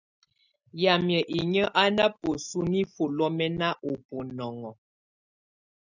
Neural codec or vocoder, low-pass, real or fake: none; 7.2 kHz; real